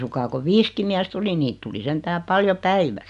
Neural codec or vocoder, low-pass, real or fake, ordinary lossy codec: none; 10.8 kHz; real; none